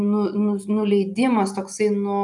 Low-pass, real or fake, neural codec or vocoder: 10.8 kHz; real; none